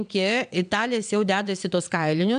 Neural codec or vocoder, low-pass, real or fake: vocoder, 22.05 kHz, 80 mel bands, WaveNeXt; 9.9 kHz; fake